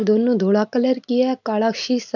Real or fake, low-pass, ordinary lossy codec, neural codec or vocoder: real; 7.2 kHz; none; none